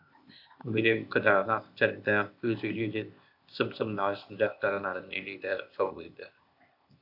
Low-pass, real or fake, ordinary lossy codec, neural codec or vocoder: 5.4 kHz; fake; AAC, 48 kbps; codec, 16 kHz, 0.8 kbps, ZipCodec